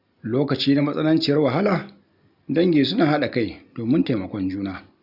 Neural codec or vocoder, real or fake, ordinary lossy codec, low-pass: none; real; none; 5.4 kHz